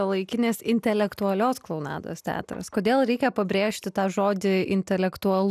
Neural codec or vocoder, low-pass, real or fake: none; 14.4 kHz; real